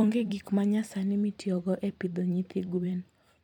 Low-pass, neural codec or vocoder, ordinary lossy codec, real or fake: 19.8 kHz; vocoder, 44.1 kHz, 128 mel bands every 256 samples, BigVGAN v2; MP3, 96 kbps; fake